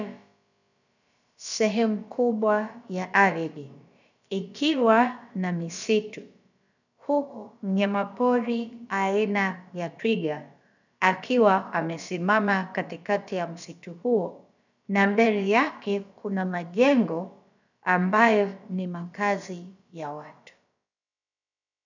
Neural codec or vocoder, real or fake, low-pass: codec, 16 kHz, about 1 kbps, DyCAST, with the encoder's durations; fake; 7.2 kHz